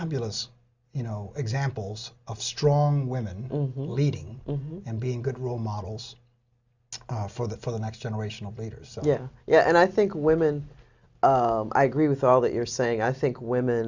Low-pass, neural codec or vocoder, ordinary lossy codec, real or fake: 7.2 kHz; none; Opus, 64 kbps; real